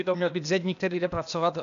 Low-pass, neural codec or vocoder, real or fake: 7.2 kHz; codec, 16 kHz, 0.8 kbps, ZipCodec; fake